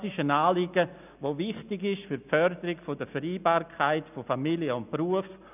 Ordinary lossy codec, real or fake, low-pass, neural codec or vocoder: none; real; 3.6 kHz; none